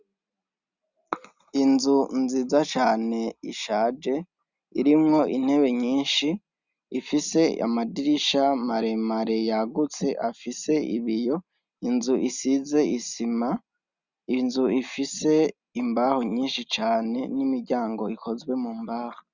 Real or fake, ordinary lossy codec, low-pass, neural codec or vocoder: real; Opus, 64 kbps; 7.2 kHz; none